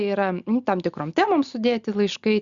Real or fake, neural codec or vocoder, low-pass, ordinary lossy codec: real; none; 7.2 kHz; Opus, 64 kbps